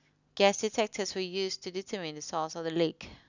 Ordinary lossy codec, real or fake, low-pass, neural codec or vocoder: none; real; 7.2 kHz; none